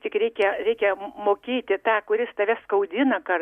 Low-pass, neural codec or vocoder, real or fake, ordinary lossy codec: 14.4 kHz; none; real; Opus, 64 kbps